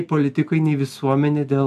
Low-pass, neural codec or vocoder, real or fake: 14.4 kHz; none; real